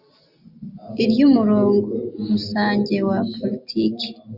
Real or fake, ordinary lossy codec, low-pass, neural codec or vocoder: real; Opus, 64 kbps; 5.4 kHz; none